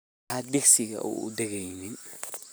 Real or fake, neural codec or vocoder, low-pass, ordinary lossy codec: real; none; none; none